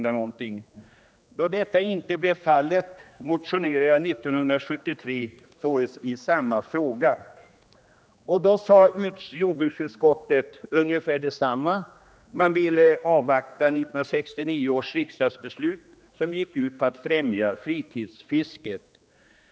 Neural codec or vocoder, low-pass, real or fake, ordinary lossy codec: codec, 16 kHz, 2 kbps, X-Codec, HuBERT features, trained on general audio; none; fake; none